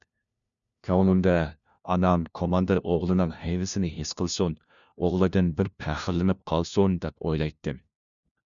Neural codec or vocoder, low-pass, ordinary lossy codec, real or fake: codec, 16 kHz, 1 kbps, FunCodec, trained on LibriTTS, 50 frames a second; 7.2 kHz; MP3, 96 kbps; fake